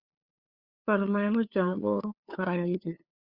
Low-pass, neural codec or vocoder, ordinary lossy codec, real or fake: 5.4 kHz; codec, 16 kHz, 2 kbps, FunCodec, trained on LibriTTS, 25 frames a second; Opus, 64 kbps; fake